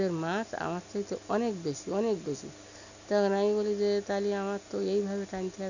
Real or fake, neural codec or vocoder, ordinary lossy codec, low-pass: real; none; none; 7.2 kHz